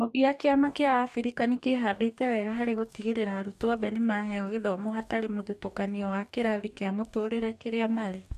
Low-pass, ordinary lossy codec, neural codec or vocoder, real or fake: 14.4 kHz; none; codec, 44.1 kHz, 2.6 kbps, DAC; fake